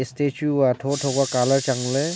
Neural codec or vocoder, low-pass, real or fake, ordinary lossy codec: none; none; real; none